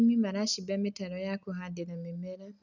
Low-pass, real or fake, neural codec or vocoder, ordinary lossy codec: 7.2 kHz; real; none; none